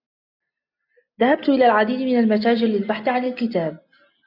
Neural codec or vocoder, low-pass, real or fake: none; 5.4 kHz; real